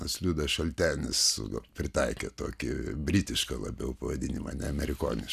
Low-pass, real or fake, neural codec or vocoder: 14.4 kHz; real; none